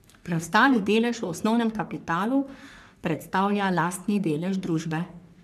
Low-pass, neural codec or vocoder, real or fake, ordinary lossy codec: 14.4 kHz; codec, 44.1 kHz, 3.4 kbps, Pupu-Codec; fake; none